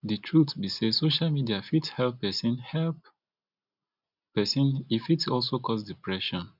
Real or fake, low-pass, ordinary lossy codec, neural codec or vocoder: real; 5.4 kHz; none; none